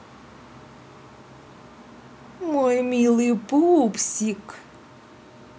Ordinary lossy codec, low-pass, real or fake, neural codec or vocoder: none; none; real; none